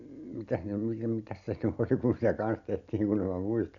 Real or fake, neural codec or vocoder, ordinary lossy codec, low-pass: real; none; none; 7.2 kHz